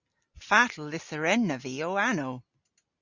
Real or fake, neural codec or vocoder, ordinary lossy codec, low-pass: real; none; Opus, 64 kbps; 7.2 kHz